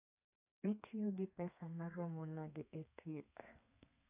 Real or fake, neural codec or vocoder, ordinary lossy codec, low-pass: fake; codec, 32 kHz, 1.9 kbps, SNAC; none; 3.6 kHz